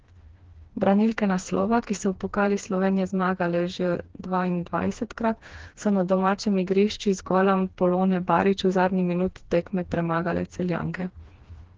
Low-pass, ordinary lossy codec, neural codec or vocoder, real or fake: 7.2 kHz; Opus, 16 kbps; codec, 16 kHz, 2 kbps, FreqCodec, smaller model; fake